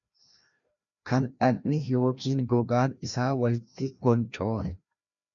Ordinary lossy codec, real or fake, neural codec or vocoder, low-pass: MP3, 64 kbps; fake; codec, 16 kHz, 1 kbps, FreqCodec, larger model; 7.2 kHz